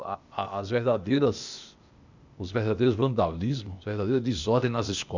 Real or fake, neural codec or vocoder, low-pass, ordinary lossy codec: fake; codec, 16 kHz, 0.8 kbps, ZipCodec; 7.2 kHz; Opus, 64 kbps